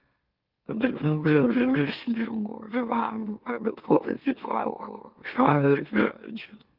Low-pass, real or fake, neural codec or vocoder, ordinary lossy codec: 5.4 kHz; fake; autoencoder, 44.1 kHz, a latent of 192 numbers a frame, MeloTTS; Opus, 32 kbps